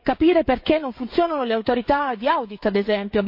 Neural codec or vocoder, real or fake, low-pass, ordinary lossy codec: codec, 16 kHz, 16 kbps, FreqCodec, smaller model; fake; 5.4 kHz; MP3, 32 kbps